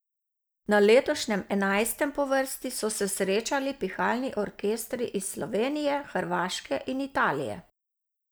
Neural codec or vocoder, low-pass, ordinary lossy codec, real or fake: none; none; none; real